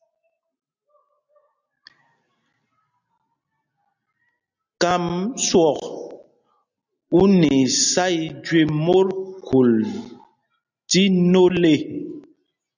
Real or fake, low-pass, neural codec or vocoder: real; 7.2 kHz; none